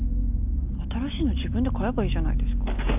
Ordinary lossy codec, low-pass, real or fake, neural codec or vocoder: none; 3.6 kHz; real; none